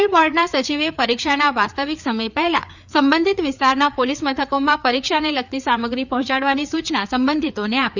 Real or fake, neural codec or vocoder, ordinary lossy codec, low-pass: fake; codec, 16 kHz, 4 kbps, FreqCodec, larger model; none; 7.2 kHz